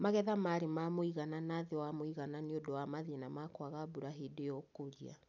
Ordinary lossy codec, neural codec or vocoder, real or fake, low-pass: none; none; real; 7.2 kHz